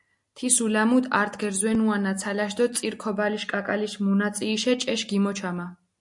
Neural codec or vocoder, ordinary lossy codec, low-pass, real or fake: none; MP3, 64 kbps; 10.8 kHz; real